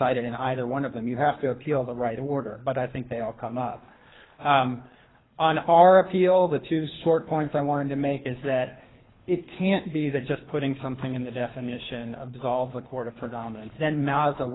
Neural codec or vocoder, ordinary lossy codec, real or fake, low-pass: codec, 24 kHz, 3 kbps, HILCodec; AAC, 16 kbps; fake; 7.2 kHz